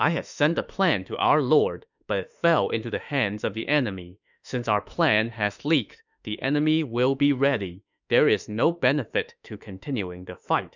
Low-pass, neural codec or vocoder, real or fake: 7.2 kHz; autoencoder, 48 kHz, 32 numbers a frame, DAC-VAE, trained on Japanese speech; fake